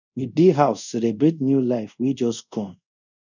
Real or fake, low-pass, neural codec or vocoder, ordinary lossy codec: fake; 7.2 kHz; codec, 24 kHz, 0.5 kbps, DualCodec; none